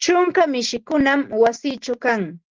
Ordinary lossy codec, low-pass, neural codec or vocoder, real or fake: Opus, 16 kbps; 7.2 kHz; none; real